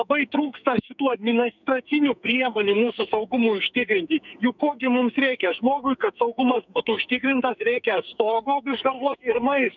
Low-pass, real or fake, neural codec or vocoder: 7.2 kHz; fake; codec, 44.1 kHz, 2.6 kbps, SNAC